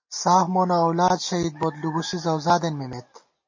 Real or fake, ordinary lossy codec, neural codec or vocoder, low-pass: real; MP3, 32 kbps; none; 7.2 kHz